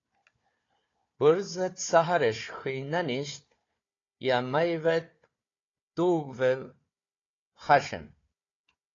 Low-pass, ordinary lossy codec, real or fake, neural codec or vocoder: 7.2 kHz; AAC, 32 kbps; fake; codec, 16 kHz, 4 kbps, FunCodec, trained on Chinese and English, 50 frames a second